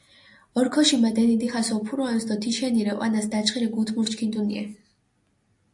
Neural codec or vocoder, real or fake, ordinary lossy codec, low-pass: none; real; AAC, 64 kbps; 10.8 kHz